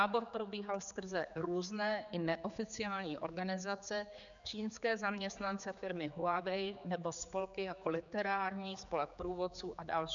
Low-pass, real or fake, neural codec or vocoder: 7.2 kHz; fake; codec, 16 kHz, 4 kbps, X-Codec, HuBERT features, trained on general audio